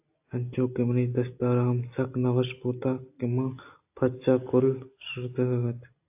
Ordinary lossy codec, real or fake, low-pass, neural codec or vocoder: AAC, 32 kbps; real; 3.6 kHz; none